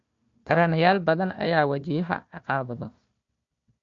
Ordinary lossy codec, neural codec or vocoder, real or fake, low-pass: MP3, 48 kbps; codec, 16 kHz, 1 kbps, FunCodec, trained on Chinese and English, 50 frames a second; fake; 7.2 kHz